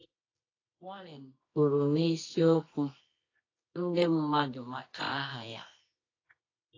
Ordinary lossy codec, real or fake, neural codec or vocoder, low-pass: AAC, 32 kbps; fake; codec, 24 kHz, 0.9 kbps, WavTokenizer, medium music audio release; 7.2 kHz